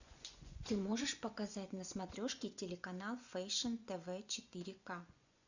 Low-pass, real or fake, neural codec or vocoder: 7.2 kHz; fake; vocoder, 24 kHz, 100 mel bands, Vocos